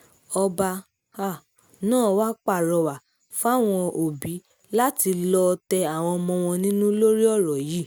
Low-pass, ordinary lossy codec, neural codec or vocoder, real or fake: none; none; none; real